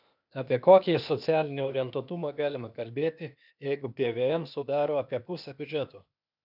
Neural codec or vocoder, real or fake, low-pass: codec, 16 kHz, 0.8 kbps, ZipCodec; fake; 5.4 kHz